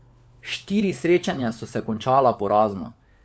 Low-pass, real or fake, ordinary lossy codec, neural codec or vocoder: none; fake; none; codec, 16 kHz, 4 kbps, FunCodec, trained on LibriTTS, 50 frames a second